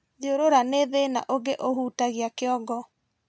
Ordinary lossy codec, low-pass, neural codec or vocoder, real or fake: none; none; none; real